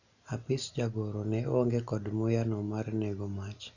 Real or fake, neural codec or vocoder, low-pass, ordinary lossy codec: real; none; 7.2 kHz; none